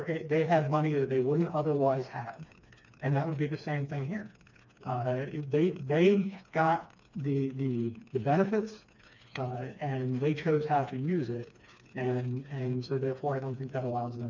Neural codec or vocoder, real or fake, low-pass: codec, 16 kHz, 2 kbps, FreqCodec, smaller model; fake; 7.2 kHz